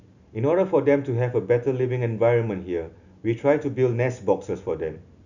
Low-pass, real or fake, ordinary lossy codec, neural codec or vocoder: 7.2 kHz; real; none; none